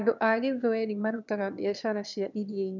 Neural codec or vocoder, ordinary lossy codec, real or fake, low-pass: autoencoder, 22.05 kHz, a latent of 192 numbers a frame, VITS, trained on one speaker; none; fake; 7.2 kHz